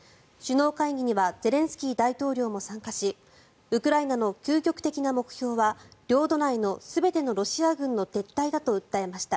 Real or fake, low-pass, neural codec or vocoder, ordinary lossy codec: real; none; none; none